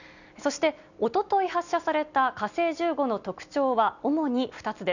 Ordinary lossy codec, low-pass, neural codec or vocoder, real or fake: none; 7.2 kHz; none; real